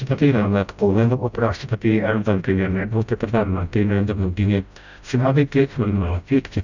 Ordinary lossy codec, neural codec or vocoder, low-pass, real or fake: none; codec, 16 kHz, 0.5 kbps, FreqCodec, smaller model; 7.2 kHz; fake